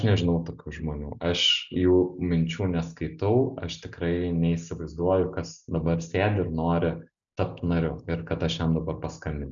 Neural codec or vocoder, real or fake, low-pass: none; real; 7.2 kHz